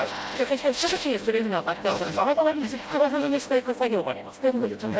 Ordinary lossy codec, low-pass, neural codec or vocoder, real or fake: none; none; codec, 16 kHz, 0.5 kbps, FreqCodec, smaller model; fake